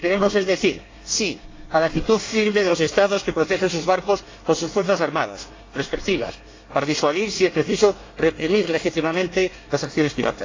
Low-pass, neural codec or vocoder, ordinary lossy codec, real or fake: 7.2 kHz; codec, 24 kHz, 1 kbps, SNAC; AAC, 32 kbps; fake